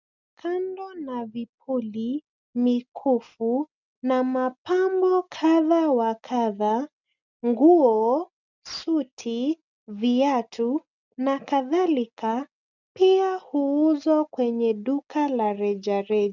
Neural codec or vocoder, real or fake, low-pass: none; real; 7.2 kHz